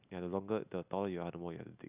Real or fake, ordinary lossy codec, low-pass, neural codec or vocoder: real; none; 3.6 kHz; none